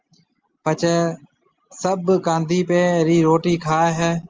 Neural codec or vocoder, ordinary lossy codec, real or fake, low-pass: none; Opus, 24 kbps; real; 7.2 kHz